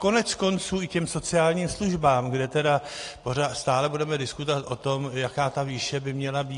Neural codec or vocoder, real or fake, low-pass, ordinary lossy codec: none; real; 10.8 kHz; AAC, 48 kbps